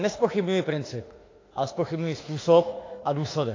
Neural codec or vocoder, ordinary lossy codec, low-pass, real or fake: autoencoder, 48 kHz, 32 numbers a frame, DAC-VAE, trained on Japanese speech; AAC, 32 kbps; 7.2 kHz; fake